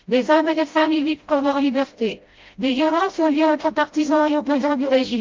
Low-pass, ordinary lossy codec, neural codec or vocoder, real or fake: 7.2 kHz; Opus, 24 kbps; codec, 16 kHz, 0.5 kbps, FreqCodec, smaller model; fake